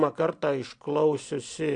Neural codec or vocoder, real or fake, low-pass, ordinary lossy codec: none; real; 9.9 kHz; AAC, 64 kbps